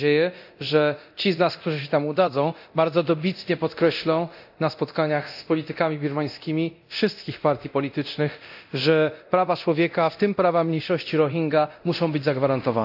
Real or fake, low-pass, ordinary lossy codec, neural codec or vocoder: fake; 5.4 kHz; AAC, 48 kbps; codec, 24 kHz, 0.9 kbps, DualCodec